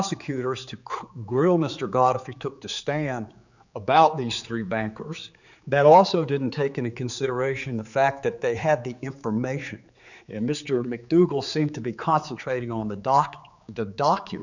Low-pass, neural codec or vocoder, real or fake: 7.2 kHz; codec, 16 kHz, 4 kbps, X-Codec, HuBERT features, trained on general audio; fake